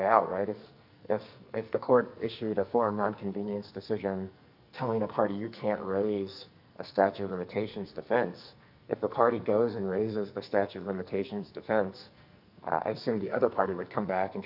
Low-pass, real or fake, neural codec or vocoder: 5.4 kHz; fake; codec, 44.1 kHz, 2.6 kbps, SNAC